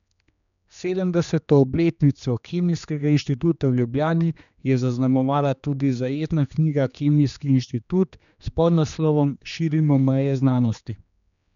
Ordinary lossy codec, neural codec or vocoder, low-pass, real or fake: none; codec, 16 kHz, 2 kbps, X-Codec, HuBERT features, trained on general audio; 7.2 kHz; fake